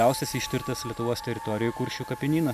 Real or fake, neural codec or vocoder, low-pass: real; none; 14.4 kHz